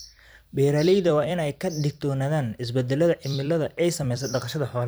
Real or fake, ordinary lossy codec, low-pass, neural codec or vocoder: fake; none; none; vocoder, 44.1 kHz, 128 mel bands every 256 samples, BigVGAN v2